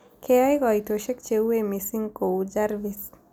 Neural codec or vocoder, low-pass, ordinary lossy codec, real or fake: none; none; none; real